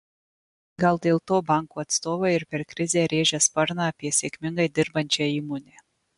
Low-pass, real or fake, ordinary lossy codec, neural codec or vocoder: 10.8 kHz; real; MP3, 64 kbps; none